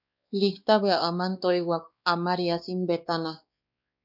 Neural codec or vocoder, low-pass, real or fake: codec, 16 kHz, 2 kbps, X-Codec, WavLM features, trained on Multilingual LibriSpeech; 5.4 kHz; fake